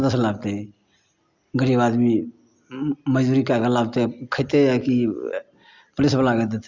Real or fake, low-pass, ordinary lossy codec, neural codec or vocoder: real; 7.2 kHz; Opus, 64 kbps; none